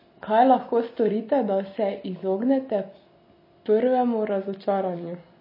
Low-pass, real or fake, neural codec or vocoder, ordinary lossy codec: 5.4 kHz; real; none; MP3, 24 kbps